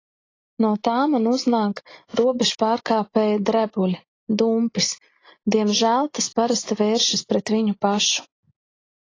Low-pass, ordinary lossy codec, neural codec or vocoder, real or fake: 7.2 kHz; AAC, 32 kbps; none; real